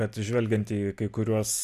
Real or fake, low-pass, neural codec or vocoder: real; 14.4 kHz; none